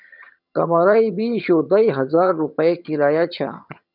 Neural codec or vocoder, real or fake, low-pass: vocoder, 22.05 kHz, 80 mel bands, HiFi-GAN; fake; 5.4 kHz